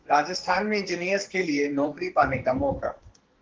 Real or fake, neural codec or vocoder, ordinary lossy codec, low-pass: fake; codec, 44.1 kHz, 2.6 kbps, SNAC; Opus, 16 kbps; 7.2 kHz